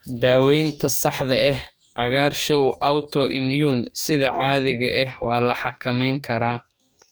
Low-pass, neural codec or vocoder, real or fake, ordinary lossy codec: none; codec, 44.1 kHz, 2.6 kbps, DAC; fake; none